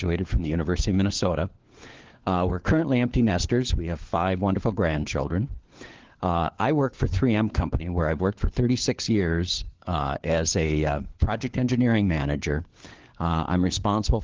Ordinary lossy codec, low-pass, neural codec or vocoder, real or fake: Opus, 16 kbps; 7.2 kHz; codec, 16 kHz, 4 kbps, FunCodec, trained on LibriTTS, 50 frames a second; fake